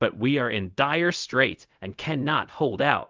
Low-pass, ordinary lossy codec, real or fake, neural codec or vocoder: 7.2 kHz; Opus, 24 kbps; fake; codec, 16 kHz, 0.4 kbps, LongCat-Audio-Codec